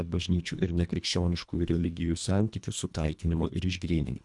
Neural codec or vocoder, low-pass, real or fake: codec, 24 kHz, 1.5 kbps, HILCodec; 10.8 kHz; fake